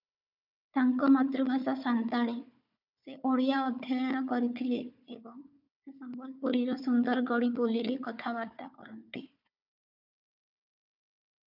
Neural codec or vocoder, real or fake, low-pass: codec, 16 kHz, 4 kbps, FunCodec, trained on Chinese and English, 50 frames a second; fake; 5.4 kHz